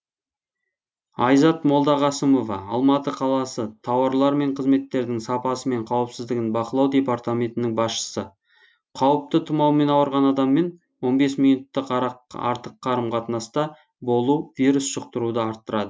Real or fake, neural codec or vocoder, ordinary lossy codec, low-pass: real; none; none; none